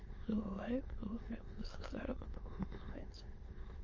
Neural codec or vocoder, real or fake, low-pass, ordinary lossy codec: autoencoder, 22.05 kHz, a latent of 192 numbers a frame, VITS, trained on many speakers; fake; 7.2 kHz; MP3, 32 kbps